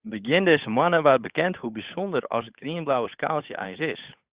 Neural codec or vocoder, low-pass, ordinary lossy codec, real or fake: codec, 16 kHz, 8 kbps, FunCodec, trained on Chinese and English, 25 frames a second; 3.6 kHz; Opus, 64 kbps; fake